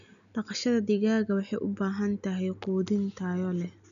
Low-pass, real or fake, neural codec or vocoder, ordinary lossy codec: 7.2 kHz; real; none; none